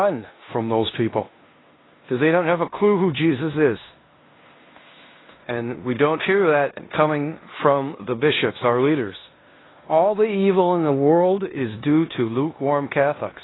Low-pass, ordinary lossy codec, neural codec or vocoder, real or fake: 7.2 kHz; AAC, 16 kbps; codec, 16 kHz in and 24 kHz out, 0.9 kbps, LongCat-Audio-Codec, four codebook decoder; fake